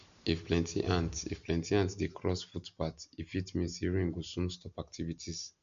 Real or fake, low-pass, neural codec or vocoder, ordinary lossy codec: real; 7.2 kHz; none; MP3, 64 kbps